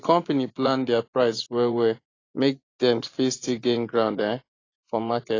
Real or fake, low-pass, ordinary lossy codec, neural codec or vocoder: fake; 7.2 kHz; AAC, 32 kbps; vocoder, 24 kHz, 100 mel bands, Vocos